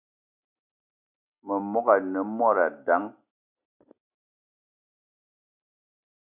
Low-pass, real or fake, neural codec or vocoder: 3.6 kHz; real; none